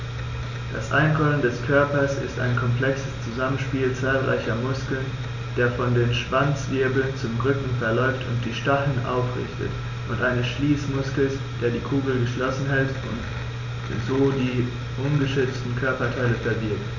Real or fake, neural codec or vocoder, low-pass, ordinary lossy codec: real; none; 7.2 kHz; none